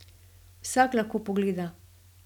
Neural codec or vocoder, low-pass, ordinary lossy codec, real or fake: none; 19.8 kHz; none; real